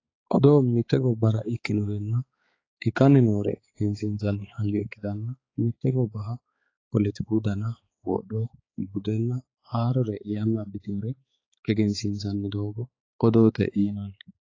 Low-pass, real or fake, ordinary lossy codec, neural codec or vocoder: 7.2 kHz; fake; AAC, 32 kbps; codec, 16 kHz, 4 kbps, X-Codec, HuBERT features, trained on balanced general audio